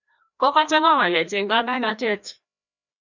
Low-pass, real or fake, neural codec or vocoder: 7.2 kHz; fake; codec, 16 kHz, 1 kbps, FreqCodec, larger model